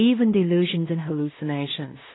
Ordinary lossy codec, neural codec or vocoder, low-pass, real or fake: AAC, 16 kbps; codec, 16 kHz in and 24 kHz out, 0.4 kbps, LongCat-Audio-Codec, two codebook decoder; 7.2 kHz; fake